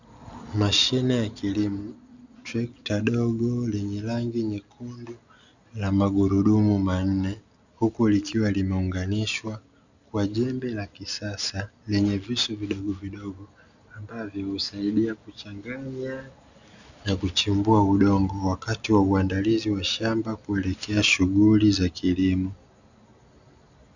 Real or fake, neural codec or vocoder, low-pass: real; none; 7.2 kHz